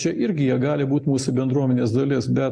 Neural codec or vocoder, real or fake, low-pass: none; real; 9.9 kHz